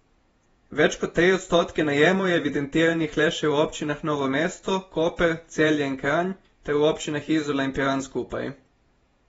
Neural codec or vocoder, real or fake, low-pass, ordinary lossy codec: vocoder, 48 kHz, 128 mel bands, Vocos; fake; 19.8 kHz; AAC, 24 kbps